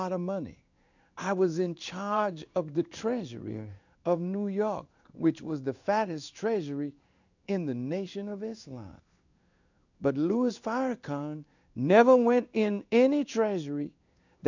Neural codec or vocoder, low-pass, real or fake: codec, 16 kHz in and 24 kHz out, 1 kbps, XY-Tokenizer; 7.2 kHz; fake